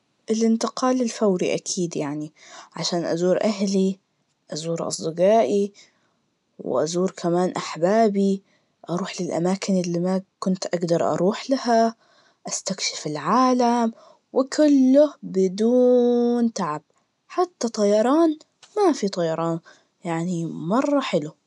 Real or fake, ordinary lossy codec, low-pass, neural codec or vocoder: real; none; 10.8 kHz; none